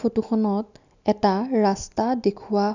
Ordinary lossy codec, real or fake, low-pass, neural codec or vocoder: none; real; 7.2 kHz; none